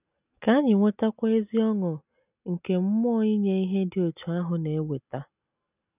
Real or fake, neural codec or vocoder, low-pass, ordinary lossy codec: real; none; 3.6 kHz; none